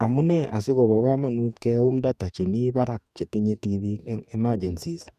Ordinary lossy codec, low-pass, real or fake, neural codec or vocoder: none; 14.4 kHz; fake; codec, 44.1 kHz, 2.6 kbps, DAC